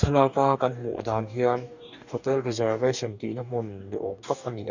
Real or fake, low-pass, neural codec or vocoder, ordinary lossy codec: fake; 7.2 kHz; codec, 44.1 kHz, 2.6 kbps, DAC; none